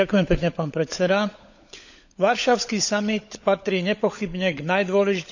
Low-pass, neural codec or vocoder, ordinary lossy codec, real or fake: 7.2 kHz; codec, 16 kHz, 16 kbps, FunCodec, trained on LibriTTS, 50 frames a second; none; fake